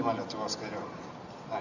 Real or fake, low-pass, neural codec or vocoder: real; 7.2 kHz; none